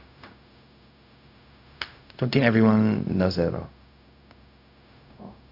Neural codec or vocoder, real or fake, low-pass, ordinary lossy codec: codec, 16 kHz, 0.4 kbps, LongCat-Audio-Codec; fake; 5.4 kHz; none